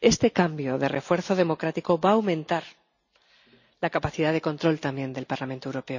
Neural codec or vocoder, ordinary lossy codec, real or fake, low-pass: none; none; real; 7.2 kHz